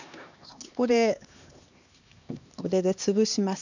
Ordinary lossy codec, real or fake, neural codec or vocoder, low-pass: none; fake; codec, 16 kHz, 2 kbps, X-Codec, HuBERT features, trained on LibriSpeech; 7.2 kHz